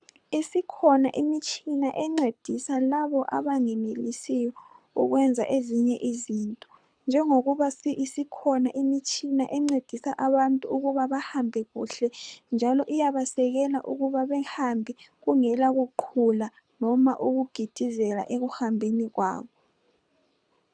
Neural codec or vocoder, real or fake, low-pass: codec, 24 kHz, 6 kbps, HILCodec; fake; 9.9 kHz